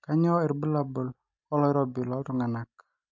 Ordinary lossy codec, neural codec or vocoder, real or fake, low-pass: MP3, 48 kbps; none; real; 7.2 kHz